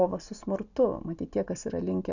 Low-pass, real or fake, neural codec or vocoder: 7.2 kHz; real; none